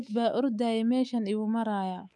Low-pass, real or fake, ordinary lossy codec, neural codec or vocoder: none; fake; none; codec, 24 kHz, 3.1 kbps, DualCodec